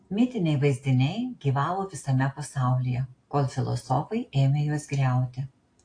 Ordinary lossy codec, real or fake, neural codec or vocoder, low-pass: AAC, 48 kbps; real; none; 9.9 kHz